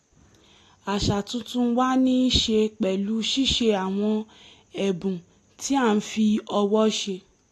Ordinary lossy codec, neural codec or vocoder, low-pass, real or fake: AAC, 48 kbps; none; 14.4 kHz; real